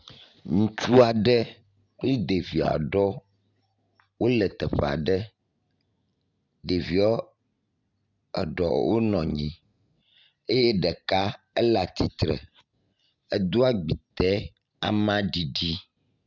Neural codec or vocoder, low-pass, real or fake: none; 7.2 kHz; real